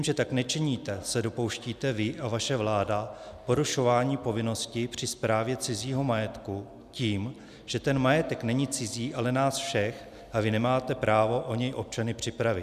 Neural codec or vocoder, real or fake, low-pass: none; real; 14.4 kHz